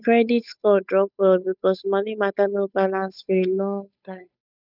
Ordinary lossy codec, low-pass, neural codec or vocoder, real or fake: none; 5.4 kHz; codec, 16 kHz, 8 kbps, FunCodec, trained on Chinese and English, 25 frames a second; fake